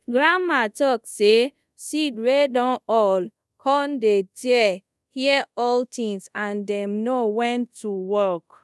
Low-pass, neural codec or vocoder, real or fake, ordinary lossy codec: none; codec, 24 kHz, 0.5 kbps, DualCodec; fake; none